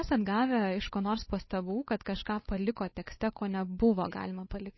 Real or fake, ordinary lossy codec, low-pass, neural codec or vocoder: fake; MP3, 24 kbps; 7.2 kHz; codec, 16 kHz, 8 kbps, FunCodec, trained on Chinese and English, 25 frames a second